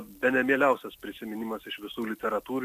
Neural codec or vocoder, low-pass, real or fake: none; 14.4 kHz; real